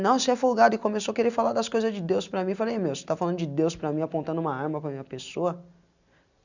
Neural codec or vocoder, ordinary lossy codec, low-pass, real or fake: none; none; 7.2 kHz; real